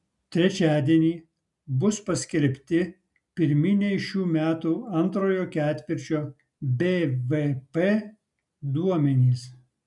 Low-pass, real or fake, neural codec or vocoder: 10.8 kHz; real; none